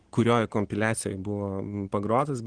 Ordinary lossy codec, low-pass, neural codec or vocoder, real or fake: Opus, 16 kbps; 9.9 kHz; none; real